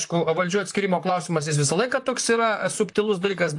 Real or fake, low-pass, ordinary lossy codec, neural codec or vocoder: fake; 10.8 kHz; AAC, 64 kbps; vocoder, 44.1 kHz, 128 mel bands, Pupu-Vocoder